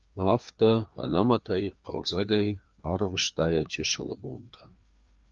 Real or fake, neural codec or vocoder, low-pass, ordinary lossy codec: fake; codec, 16 kHz, 2 kbps, FreqCodec, larger model; 7.2 kHz; Opus, 32 kbps